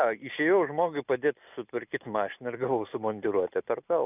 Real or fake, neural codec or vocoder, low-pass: real; none; 3.6 kHz